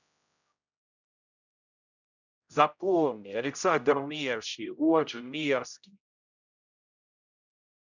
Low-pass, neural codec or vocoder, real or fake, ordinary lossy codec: 7.2 kHz; codec, 16 kHz, 0.5 kbps, X-Codec, HuBERT features, trained on general audio; fake; none